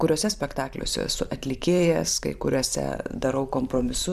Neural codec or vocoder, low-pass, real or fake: vocoder, 44.1 kHz, 128 mel bands every 512 samples, BigVGAN v2; 14.4 kHz; fake